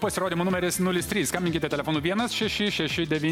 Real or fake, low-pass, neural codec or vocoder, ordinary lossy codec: real; 19.8 kHz; none; MP3, 96 kbps